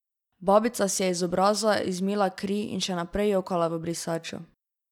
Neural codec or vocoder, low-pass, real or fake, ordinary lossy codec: none; 19.8 kHz; real; none